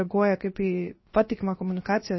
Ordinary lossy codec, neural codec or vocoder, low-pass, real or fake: MP3, 24 kbps; codec, 16 kHz, about 1 kbps, DyCAST, with the encoder's durations; 7.2 kHz; fake